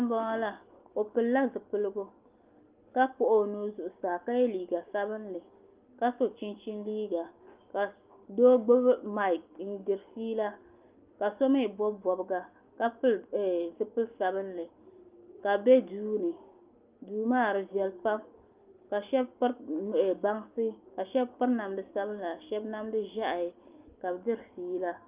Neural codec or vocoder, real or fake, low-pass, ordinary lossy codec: none; real; 3.6 kHz; Opus, 32 kbps